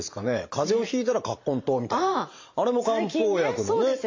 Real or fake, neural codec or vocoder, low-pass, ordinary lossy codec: fake; vocoder, 44.1 kHz, 128 mel bands every 512 samples, BigVGAN v2; 7.2 kHz; MP3, 48 kbps